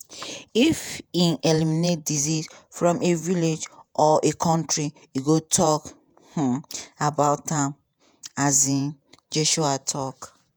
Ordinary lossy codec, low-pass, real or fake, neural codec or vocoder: none; none; fake; vocoder, 48 kHz, 128 mel bands, Vocos